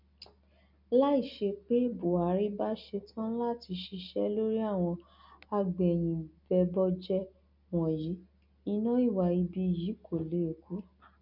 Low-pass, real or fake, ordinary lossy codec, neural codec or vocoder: 5.4 kHz; real; none; none